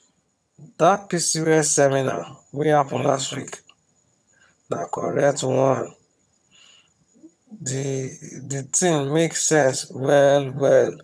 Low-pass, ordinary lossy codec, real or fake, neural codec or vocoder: none; none; fake; vocoder, 22.05 kHz, 80 mel bands, HiFi-GAN